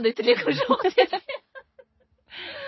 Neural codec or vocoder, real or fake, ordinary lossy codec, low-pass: none; real; MP3, 24 kbps; 7.2 kHz